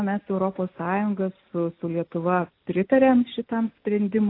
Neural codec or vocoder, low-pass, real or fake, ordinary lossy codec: none; 5.4 kHz; real; AAC, 32 kbps